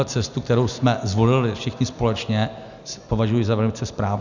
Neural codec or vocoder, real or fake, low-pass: none; real; 7.2 kHz